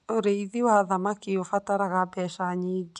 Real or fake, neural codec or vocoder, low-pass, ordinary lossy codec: real; none; 10.8 kHz; none